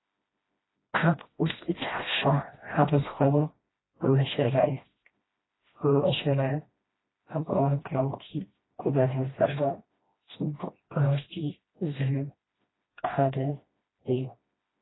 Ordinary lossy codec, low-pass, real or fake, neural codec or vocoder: AAC, 16 kbps; 7.2 kHz; fake; codec, 16 kHz, 1 kbps, FreqCodec, smaller model